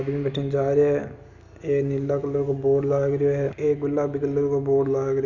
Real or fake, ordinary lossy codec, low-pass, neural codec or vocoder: real; none; 7.2 kHz; none